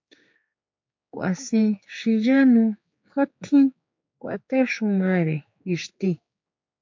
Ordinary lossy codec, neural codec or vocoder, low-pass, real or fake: MP3, 48 kbps; codec, 16 kHz, 4 kbps, X-Codec, HuBERT features, trained on general audio; 7.2 kHz; fake